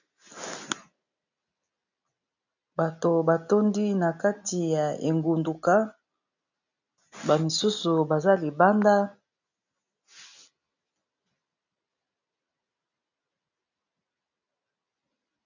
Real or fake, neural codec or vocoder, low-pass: real; none; 7.2 kHz